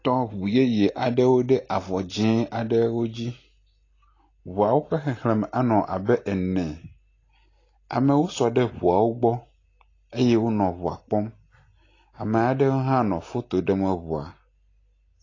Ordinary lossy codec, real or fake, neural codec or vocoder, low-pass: AAC, 32 kbps; real; none; 7.2 kHz